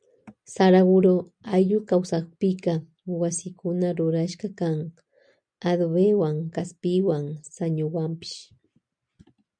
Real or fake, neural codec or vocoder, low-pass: real; none; 9.9 kHz